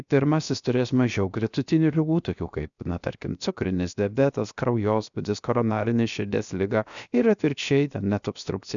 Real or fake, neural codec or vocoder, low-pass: fake; codec, 16 kHz, 0.7 kbps, FocalCodec; 7.2 kHz